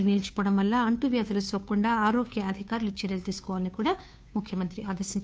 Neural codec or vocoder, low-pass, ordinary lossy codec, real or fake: codec, 16 kHz, 2 kbps, FunCodec, trained on Chinese and English, 25 frames a second; none; none; fake